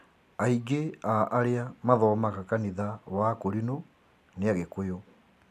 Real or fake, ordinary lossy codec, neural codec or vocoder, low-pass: real; none; none; 14.4 kHz